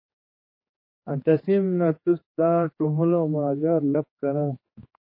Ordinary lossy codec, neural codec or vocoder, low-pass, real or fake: MP3, 24 kbps; codec, 16 kHz, 4 kbps, X-Codec, HuBERT features, trained on general audio; 5.4 kHz; fake